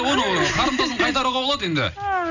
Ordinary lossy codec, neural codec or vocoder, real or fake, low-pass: none; none; real; 7.2 kHz